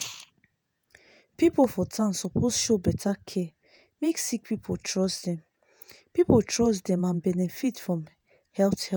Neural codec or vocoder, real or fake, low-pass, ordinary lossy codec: vocoder, 48 kHz, 128 mel bands, Vocos; fake; none; none